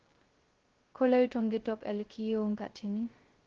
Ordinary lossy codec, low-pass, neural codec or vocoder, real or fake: Opus, 16 kbps; 7.2 kHz; codec, 16 kHz, 0.2 kbps, FocalCodec; fake